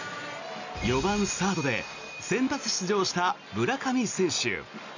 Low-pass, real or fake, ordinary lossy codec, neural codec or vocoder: 7.2 kHz; real; none; none